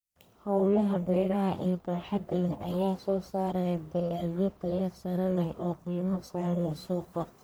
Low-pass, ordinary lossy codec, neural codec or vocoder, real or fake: none; none; codec, 44.1 kHz, 1.7 kbps, Pupu-Codec; fake